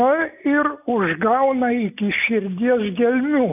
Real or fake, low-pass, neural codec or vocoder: real; 3.6 kHz; none